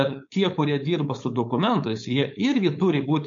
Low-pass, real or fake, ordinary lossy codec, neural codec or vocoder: 7.2 kHz; fake; MP3, 48 kbps; codec, 16 kHz, 4.8 kbps, FACodec